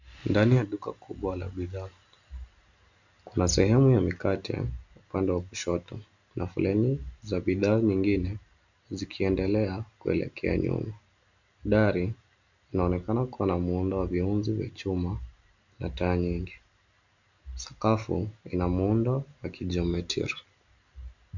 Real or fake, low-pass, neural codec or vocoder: real; 7.2 kHz; none